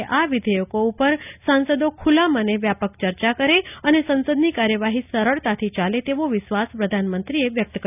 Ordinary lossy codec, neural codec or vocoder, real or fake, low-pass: none; none; real; 3.6 kHz